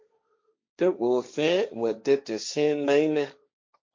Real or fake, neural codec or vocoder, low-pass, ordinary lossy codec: fake; codec, 16 kHz, 1.1 kbps, Voila-Tokenizer; 7.2 kHz; MP3, 48 kbps